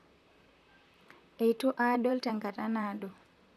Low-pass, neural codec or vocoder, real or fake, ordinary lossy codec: 14.4 kHz; vocoder, 44.1 kHz, 128 mel bands, Pupu-Vocoder; fake; none